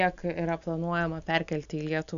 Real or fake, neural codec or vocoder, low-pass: real; none; 7.2 kHz